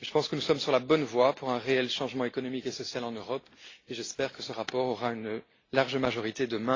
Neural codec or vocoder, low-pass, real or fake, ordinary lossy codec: none; 7.2 kHz; real; AAC, 32 kbps